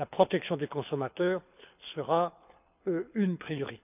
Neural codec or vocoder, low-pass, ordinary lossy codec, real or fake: codec, 24 kHz, 6 kbps, HILCodec; 3.6 kHz; none; fake